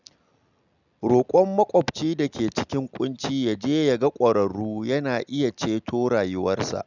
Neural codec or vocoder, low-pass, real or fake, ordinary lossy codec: none; 7.2 kHz; real; none